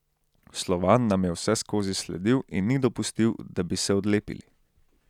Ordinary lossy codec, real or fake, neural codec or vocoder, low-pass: none; real; none; 19.8 kHz